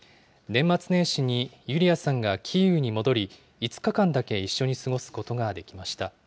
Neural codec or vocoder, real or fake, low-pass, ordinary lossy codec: none; real; none; none